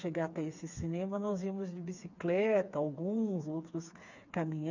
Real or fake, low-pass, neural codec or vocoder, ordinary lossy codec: fake; 7.2 kHz; codec, 16 kHz, 4 kbps, FreqCodec, smaller model; none